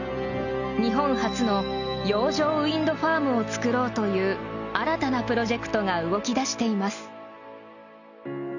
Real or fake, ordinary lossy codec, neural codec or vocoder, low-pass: real; none; none; 7.2 kHz